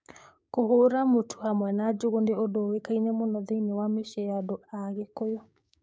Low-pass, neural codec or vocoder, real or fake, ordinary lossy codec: none; codec, 16 kHz, 6 kbps, DAC; fake; none